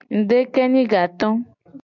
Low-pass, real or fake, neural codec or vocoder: 7.2 kHz; real; none